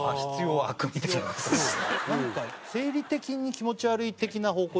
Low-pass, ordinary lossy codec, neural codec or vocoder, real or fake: none; none; none; real